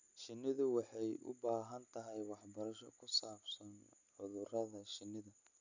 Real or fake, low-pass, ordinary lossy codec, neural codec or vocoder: real; 7.2 kHz; none; none